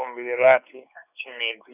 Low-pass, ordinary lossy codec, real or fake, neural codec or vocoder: 3.6 kHz; none; fake; codec, 16 kHz, 4 kbps, X-Codec, WavLM features, trained on Multilingual LibriSpeech